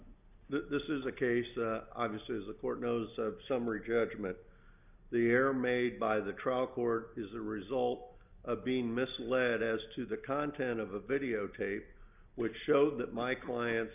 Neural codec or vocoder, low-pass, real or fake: none; 3.6 kHz; real